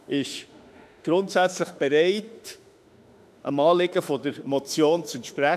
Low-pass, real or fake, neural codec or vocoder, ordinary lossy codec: 14.4 kHz; fake; autoencoder, 48 kHz, 32 numbers a frame, DAC-VAE, trained on Japanese speech; none